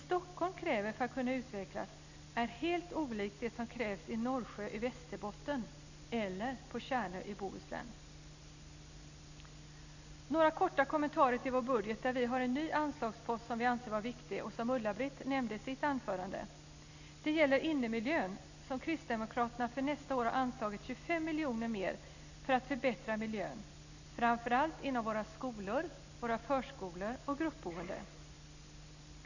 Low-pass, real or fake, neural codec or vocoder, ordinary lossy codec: 7.2 kHz; real; none; none